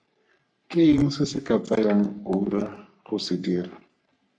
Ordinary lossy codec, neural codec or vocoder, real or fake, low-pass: MP3, 96 kbps; codec, 44.1 kHz, 3.4 kbps, Pupu-Codec; fake; 9.9 kHz